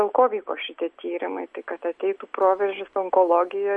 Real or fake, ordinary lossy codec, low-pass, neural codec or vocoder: real; MP3, 48 kbps; 10.8 kHz; none